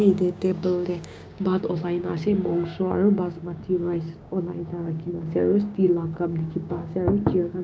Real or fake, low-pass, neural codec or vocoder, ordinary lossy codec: fake; none; codec, 16 kHz, 6 kbps, DAC; none